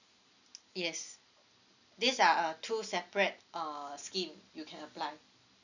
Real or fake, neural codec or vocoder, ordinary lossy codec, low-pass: real; none; none; 7.2 kHz